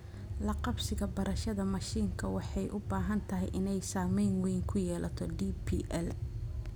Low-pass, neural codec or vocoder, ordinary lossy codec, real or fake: none; none; none; real